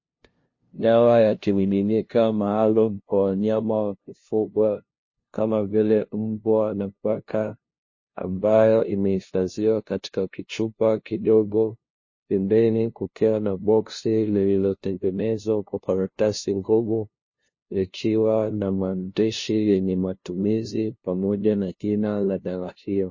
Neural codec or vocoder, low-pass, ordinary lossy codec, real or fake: codec, 16 kHz, 0.5 kbps, FunCodec, trained on LibriTTS, 25 frames a second; 7.2 kHz; MP3, 32 kbps; fake